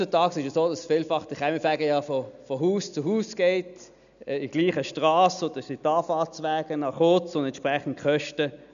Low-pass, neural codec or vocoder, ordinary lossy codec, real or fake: 7.2 kHz; none; none; real